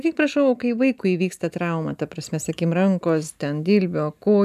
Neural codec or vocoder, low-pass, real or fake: none; 14.4 kHz; real